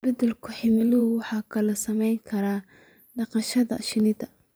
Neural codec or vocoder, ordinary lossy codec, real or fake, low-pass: vocoder, 44.1 kHz, 128 mel bands every 512 samples, BigVGAN v2; none; fake; none